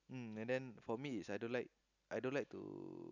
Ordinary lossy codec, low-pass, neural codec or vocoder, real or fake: none; 7.2 kHz; none; real